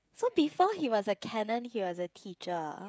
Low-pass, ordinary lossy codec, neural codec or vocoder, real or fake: none; none; codec, 16 kHz, 16 kbps, FreqCodec, smaller model; fake